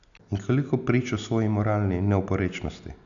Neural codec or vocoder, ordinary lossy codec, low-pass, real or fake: none; none; 7.2 kHz; real